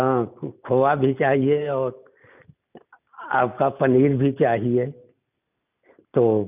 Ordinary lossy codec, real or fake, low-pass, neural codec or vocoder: none; real; 3.6 kHz; none